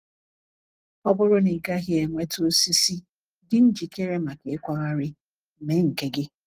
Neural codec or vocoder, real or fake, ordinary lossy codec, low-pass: none; real; Opus, 24 kbps; 14.4 kHz